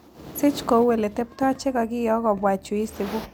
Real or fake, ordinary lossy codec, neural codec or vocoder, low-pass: real; none; none; none